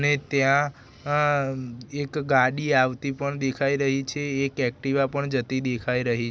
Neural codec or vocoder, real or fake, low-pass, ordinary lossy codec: none; real; none; none